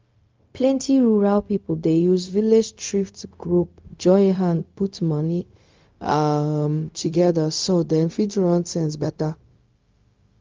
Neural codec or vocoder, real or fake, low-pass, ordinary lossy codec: codec, 16 kHz, 0.4 kbps, LongCat-Audio-Codec; fake; 7.2 kHz; Opus, 24 kbps